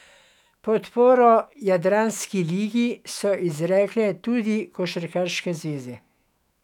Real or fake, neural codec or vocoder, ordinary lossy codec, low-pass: fake; autoencoder, 48 kHz, 128 numbers a frame, DAC-VAE, trained on Japanese speech; none; 19.8 kHz